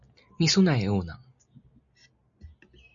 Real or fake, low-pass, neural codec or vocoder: real; 7.2 kHz; none